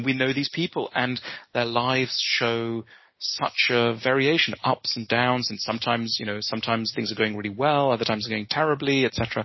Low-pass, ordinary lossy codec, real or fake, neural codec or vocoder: 7.2 kHz; MP3, 24 kbps; real; none